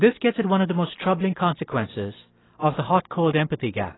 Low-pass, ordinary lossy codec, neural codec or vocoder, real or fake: 7.2 kHz; AAC, 16 kbps; none; real